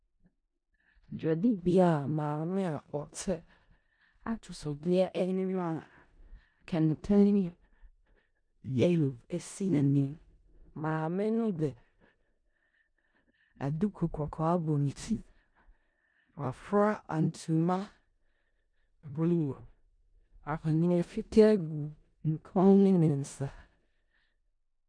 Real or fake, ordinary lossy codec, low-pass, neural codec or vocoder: fake; MP3, 96 kbps; 9.9 kHz; codec, 16 kHz in and 24 kHz out, 0.4 kbps, LongCat-Audio-Codec, four codebook decoder